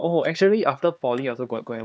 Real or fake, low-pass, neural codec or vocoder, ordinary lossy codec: fake; none; codec, 16 kHz, 4 kbps, X-Codec, HuBERT features, trained on LibriSpeech; none